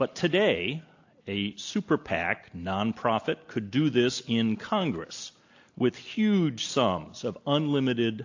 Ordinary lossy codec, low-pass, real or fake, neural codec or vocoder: AAC, 48 kbps; 7.2 kHz; real; none